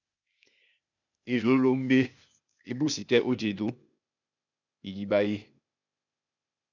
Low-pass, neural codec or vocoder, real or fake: 7.2 kHz; codec, 16 kHz, 0.8 kbps, ZipCodec; fake